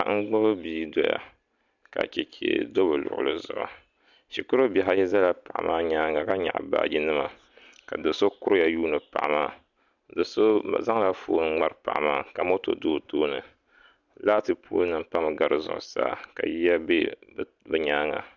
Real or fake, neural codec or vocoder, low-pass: real; none; 7.2 kHz